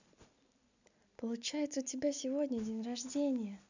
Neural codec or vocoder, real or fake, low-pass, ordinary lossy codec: none; real; 7.2 kHz; none